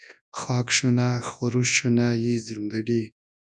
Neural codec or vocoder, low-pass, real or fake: codec, 24 kHz, 0.9 kbps, WavTokenizer, large speech release; 10.8 kHz; fake